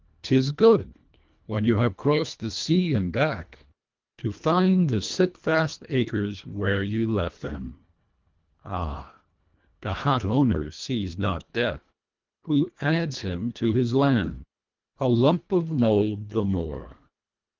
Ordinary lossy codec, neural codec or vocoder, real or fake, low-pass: Opus, 32 kbps; codec, 24 kHz, 1.5 kbps, HILCodec; fake; 7.2 kHz